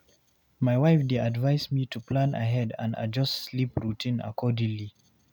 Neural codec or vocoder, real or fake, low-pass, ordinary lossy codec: none; real; 19.8 kHz; none